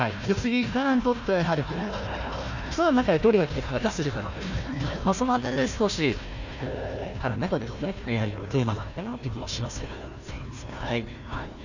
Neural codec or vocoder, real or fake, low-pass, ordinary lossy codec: codec, 16 kHz, 1 kbps, FunCodec, trained on Chinese and English, 50 frames a second; fake; 7.2 kHz; none